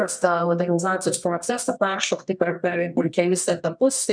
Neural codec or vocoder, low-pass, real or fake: codec, 24 kHz, 0.9 kbps, WavTokenizer, medium music audio release; 9.9 kHz; fake